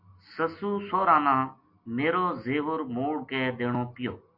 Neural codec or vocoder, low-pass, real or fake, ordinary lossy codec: none; 5.4 kHz; real; MP3, 32 kbps